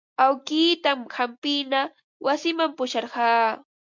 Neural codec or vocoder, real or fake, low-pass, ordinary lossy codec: none; real; 7.2 kHz; MP3, 64 kbps